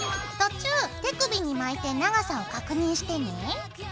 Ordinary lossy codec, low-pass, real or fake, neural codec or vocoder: none; none; real; none